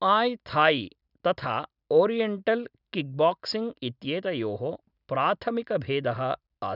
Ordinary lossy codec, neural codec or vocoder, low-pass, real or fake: none; none; 5.4 kHz; real